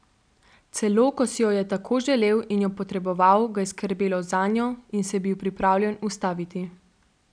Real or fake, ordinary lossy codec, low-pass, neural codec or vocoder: real; none; 9.9 kHz; none